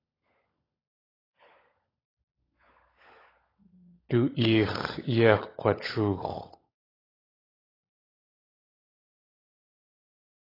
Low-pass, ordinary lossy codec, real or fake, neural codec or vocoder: 5.4 kHz; AAC, 24 kbps; fake; codec, 16 kHz, 16 kbps, FunCodec, trained on LibriTTS, 50 frames a second